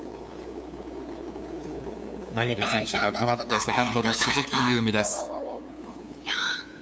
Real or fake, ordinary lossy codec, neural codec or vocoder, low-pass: fake; none; codec, 16 kHz, 2 kbps, FunCodec, trained on LibriTTS, 25 frames a second; none